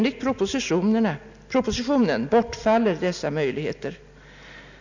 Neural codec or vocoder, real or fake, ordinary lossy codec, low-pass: none; real; none; 7.2 kHz